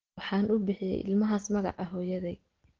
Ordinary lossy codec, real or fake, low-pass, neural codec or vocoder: Opus, 16 kbps; real; 7.2 kHz; none